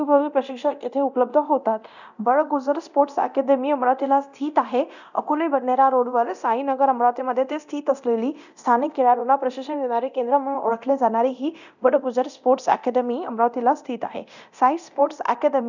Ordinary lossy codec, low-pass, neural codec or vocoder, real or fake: none; 7.2 kHz; codec, 24 kHz, 0.9 kbps, DualCodec; fake